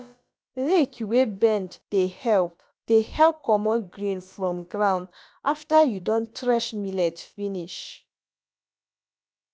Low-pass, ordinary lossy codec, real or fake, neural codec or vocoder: none; none; fake; codec, 16 kHz, about 1 kbps, DyCAST, with the encoder's durations